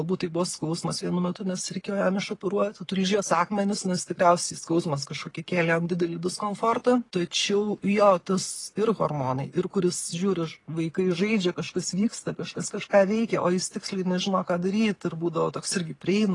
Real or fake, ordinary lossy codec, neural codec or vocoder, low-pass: real; AAC, 32 kbps; none; 10.8 kHz